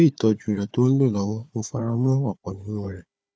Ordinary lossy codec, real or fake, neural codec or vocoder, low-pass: none; fake; codec, 16 kHz, 4 kbps, FunCodec, trained on Chinese and English, 50 frames a second; none